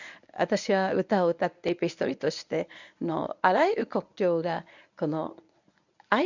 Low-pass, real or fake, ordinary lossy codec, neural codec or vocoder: 7.2 kHz; fake; none; codec, 24 kHz, 0.9 kbps, WavTokenizer, medium speech release version 1